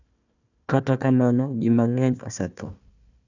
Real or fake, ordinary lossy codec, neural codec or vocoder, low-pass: fake; none; codec, 16 kHz, 1 kbps, FunCodec, trained on Chinese and English, 50 frames a second; 7.2 kHz